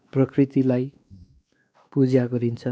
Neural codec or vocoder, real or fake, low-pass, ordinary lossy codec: codec, 16 kHz, 2 kbps, X-Codec, WavLM features, trained on Multilingual LibriSpeech; fake; none; none